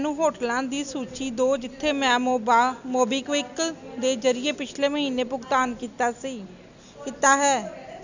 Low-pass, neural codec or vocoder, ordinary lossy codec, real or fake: 7.2 kHz; none; none; real